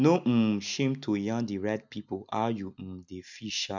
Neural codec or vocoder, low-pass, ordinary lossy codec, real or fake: none; 7.2 kHz; none; real